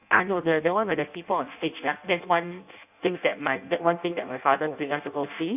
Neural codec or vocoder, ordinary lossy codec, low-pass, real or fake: codec, 16 kHz in and 24 kHz out, 0.6 kbps, FireRedTTS-2 codec; none; 3.6 kHz; fake